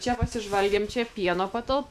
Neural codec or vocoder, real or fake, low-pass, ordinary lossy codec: autoencoder, 48 kHz, 128 numbers a frame, DAC-VAE, trained on Japanese speech; fake; 14.4 kHz; AAC, 96 kbps